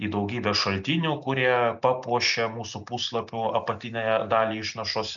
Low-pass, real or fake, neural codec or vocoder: 7.2 kHz; real; none